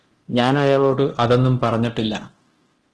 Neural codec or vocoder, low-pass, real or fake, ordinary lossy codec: codec, 24 kHz, 0.9 kbps, DualCodec; 10.8 kHz; fake; Opus, 16 kbps